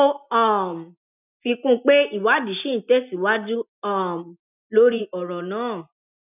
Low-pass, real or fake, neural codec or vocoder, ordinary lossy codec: 3.6 kHz; real; none; none